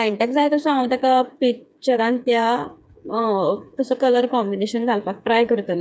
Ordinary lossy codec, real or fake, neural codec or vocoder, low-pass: none; fake; codec, 16 kHz, 4 kbps, FreqCodec, smaller model; none